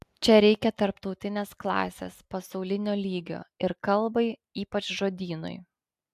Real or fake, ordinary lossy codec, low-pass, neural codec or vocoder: real; AAC, 96 kbps; 14.4 kHz; none